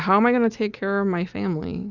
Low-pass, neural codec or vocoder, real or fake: 7.2 kHz; none; real